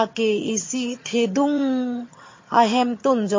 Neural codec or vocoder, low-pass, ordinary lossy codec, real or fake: vocoder, 22.05 kHz, 80 mel bands, HiFi-GAN; 7.2 kHz; MP3, 32 kbps; fake